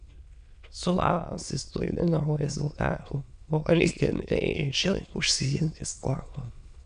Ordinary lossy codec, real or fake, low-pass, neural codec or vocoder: AAC, 96 kbps; fake; 9.9 kHz; autoencoder, 22.05 kHz, a latent of 192 numbers a frame, VITS, trained on many speakers